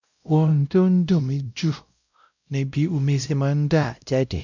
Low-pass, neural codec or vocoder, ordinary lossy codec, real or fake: 7.2 kHz; codec, 16 kHz, 0.5 kbps, X-Codec, WavLM features, trained on Multilingual LibriSpeech; none; fake